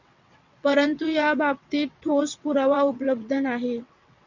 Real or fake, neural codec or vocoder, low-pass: fake; vocoder, 22.05 kHz, 80 mel bands, WaveNeXt; 7.2 kHz